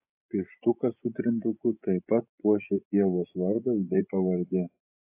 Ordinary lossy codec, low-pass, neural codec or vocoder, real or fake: AAC, 32 kbps; 3.6 kHz; vocoder, 24 kHz, 100 mel bands, Vocos; fake